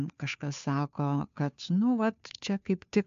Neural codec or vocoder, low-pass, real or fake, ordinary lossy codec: codec, 16 kHz, 2 kbps, FunCodec, trained on Chinese and English, 25 frames a second; 7.2 kHz; fake; MP3, 64 kbps